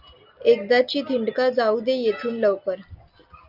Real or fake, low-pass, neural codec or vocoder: real; 5.4 kHz; none